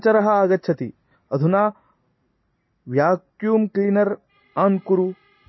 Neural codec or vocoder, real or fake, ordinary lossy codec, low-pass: none; real; MP3, 24 kbps; 7.2 kHz